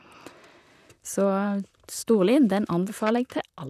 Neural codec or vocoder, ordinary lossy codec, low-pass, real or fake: vocoder, 44.1 kHz, 128 mel bands, Pupu-Vocoder; none; 14.4 kHz; fake